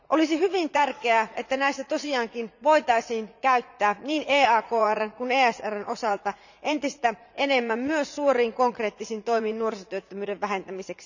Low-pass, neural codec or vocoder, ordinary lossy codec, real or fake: 7.2 kHz; vocoder, 44.1 kHz, 80 mel bands, Vocos; none; fake